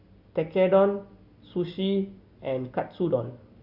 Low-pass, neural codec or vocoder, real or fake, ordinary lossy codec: 5.4 kHz; none; real; Opus, 64 kbps